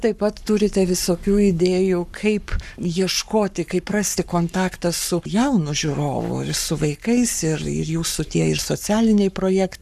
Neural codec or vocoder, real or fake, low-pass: codec, 44.1 kHz, 7.8 kbps, Pupu-Codec; fake; 14.4 kHz